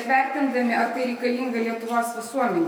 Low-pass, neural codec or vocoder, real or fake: 19.8 kHz; autoencoder, 48 kHz, 128 numbers a frame, DAC-VAE, trained on Japanese speech; fake